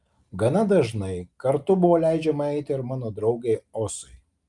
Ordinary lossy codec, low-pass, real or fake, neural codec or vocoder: Opus, 32 kbps; 10.8 kHz; real; none